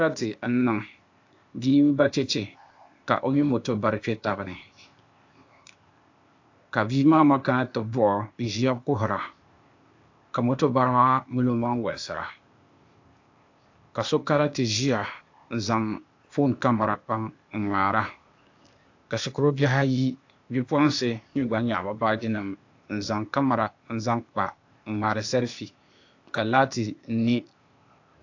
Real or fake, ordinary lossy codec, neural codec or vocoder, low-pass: fake; AAC, 48 kbps; codec, 16 kHz, 0.8 kbps, ZipCodec; 7.2 kHz